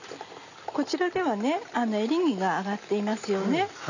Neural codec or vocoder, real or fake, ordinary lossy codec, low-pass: none; real; none; 7.2 kHz